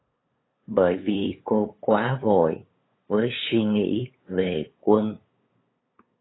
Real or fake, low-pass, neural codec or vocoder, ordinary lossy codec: fake; 7.2 kHz; codec, 16 kHz, 8 kbps, FunCodec, trained on LibriTTS, 25 frames a second; AAC, 16 kbps